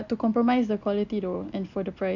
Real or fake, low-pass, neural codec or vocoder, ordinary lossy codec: real; 7.2 kHz; none; none